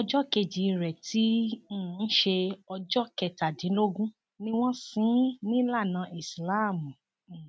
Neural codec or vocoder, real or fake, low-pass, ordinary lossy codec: none; real; none; none